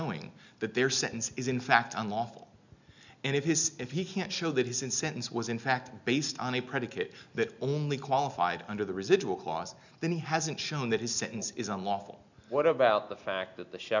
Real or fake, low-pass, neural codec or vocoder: real; 7.2 kHz; none